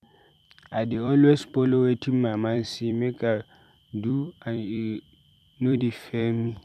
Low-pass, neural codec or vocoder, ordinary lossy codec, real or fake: 14.4 kHz; vocoder, 44.1 kHz, 128 mel bands every 256 samples, BigVGAN v2; none; fake